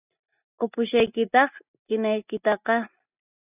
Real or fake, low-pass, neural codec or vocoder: real; 3.6 kHz; none